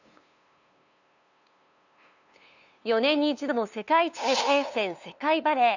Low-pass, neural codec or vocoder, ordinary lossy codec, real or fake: 7.2 kHz; codec, 16 kHz, 2 kbps, FunCodec, trained on LibriTTS, 25 frames a second; none; fake